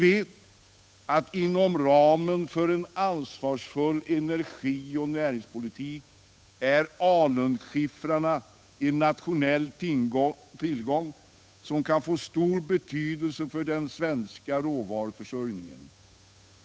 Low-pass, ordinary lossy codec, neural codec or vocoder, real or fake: none; none; codec, 16 kHz, 8 kbps, FunCodec, trained on Chinese and English, 25 frames a second; fake